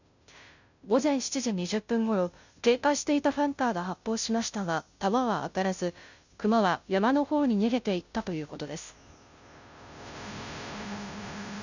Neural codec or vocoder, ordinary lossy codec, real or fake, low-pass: codec, 16 kHz, 0.5 kbps, FunCodec, trained on Chinese and English, 25 frames a second; none; fake; 7.2 kHz